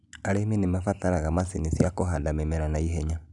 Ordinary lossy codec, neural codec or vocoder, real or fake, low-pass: none; none; real; 10.8 kHz